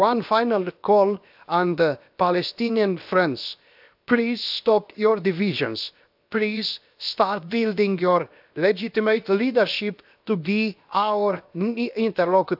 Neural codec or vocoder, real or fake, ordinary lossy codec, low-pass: codec, 16 kHz, 0.7 kbps, FocalCodec; fake; AAC, 48 kbps; 5.4 kHz